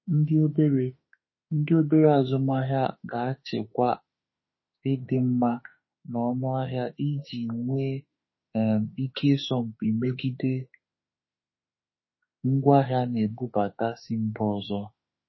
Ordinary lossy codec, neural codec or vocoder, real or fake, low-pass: MP3, 24 kbps; autoencoder, 48 kHz, 32 numbers a frame, DAC-VAE, trained on Japanese speech; fake; 7.2 kHz